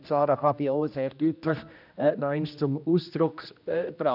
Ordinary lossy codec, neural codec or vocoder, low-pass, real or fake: none; codec, 16 kHz, 1 kbps, X-Codec, HuBERT features, trained on general audio; 5.4 kHz; fake